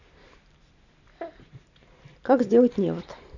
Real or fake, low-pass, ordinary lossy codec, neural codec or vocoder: real; 7.2 kHz; none; none